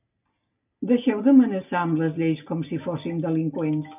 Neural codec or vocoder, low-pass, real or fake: none; 3.6 kHz; real